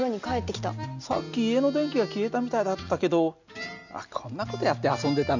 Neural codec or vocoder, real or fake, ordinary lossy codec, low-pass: none; real; none; 7.2 kHz